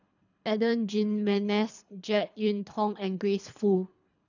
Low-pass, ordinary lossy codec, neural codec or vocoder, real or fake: 7.2 kHz; none; codec, 24 kHz, 3 kbps, HILCodec; fake